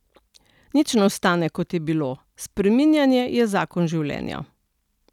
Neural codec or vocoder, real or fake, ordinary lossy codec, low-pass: none; real; none; 19.8 kHz